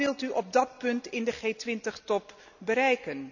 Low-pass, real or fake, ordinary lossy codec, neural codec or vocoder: 7.2 kHz; real; none; none